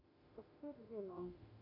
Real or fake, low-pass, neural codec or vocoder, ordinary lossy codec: fake; 5.4 kHz; autoencoder, 48 kHz, 32 numbers a frame, DAC-VAE, trained on Japanese speech; MP3, 32 kbps